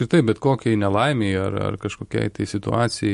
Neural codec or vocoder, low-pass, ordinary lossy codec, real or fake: none; 14.4 kHz; MP3, 48 kbps; real